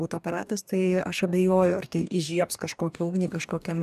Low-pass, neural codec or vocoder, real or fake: 14.4 kHz; codec, 44.1 kHz, 2.6 kbps, DAC; fake